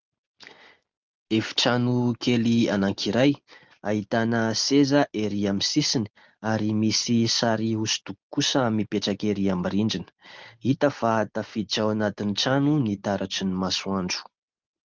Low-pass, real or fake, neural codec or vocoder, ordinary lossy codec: 7.2 kHz; real; none; Opus, 32 kbps